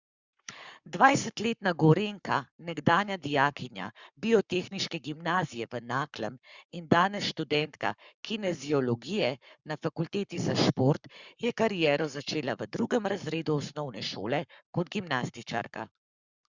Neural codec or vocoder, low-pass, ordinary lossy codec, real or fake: codec, 44.1 kHz, 7.8 kbps, Pupu-Codec; 7.2 kHz; Opus, 64 kbps; fake